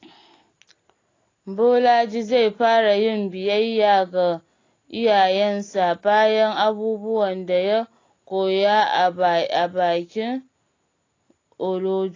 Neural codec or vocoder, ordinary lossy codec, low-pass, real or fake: none; AAC, 32 kbps; 7.2 kHz; real